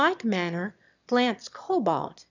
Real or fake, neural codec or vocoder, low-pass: fake; autoencoder, 22.05 kHz, a latent of 192 numbers a frame, VITS, trained on one speaker; 7.2 kHz